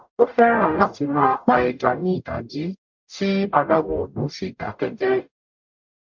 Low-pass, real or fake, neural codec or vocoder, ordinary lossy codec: 7.2 kHz; fake; codec, 44.1 kHz, 0.9 kbps, DAC; MP3, 64 kbps